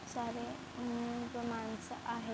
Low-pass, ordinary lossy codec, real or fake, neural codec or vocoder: none; none; real; none